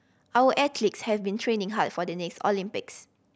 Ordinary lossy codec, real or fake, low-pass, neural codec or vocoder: none; real; none; none